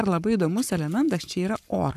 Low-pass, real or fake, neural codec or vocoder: 14.4 kHz; fake; codec, 44.1 kHz, 7.8 kbps, Pupu-Codec